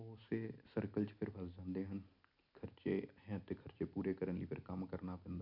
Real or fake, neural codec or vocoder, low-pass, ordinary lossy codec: real; none; 5.4 kHz; none